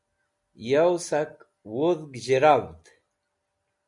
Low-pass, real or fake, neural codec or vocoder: 10.8 kHz; real; none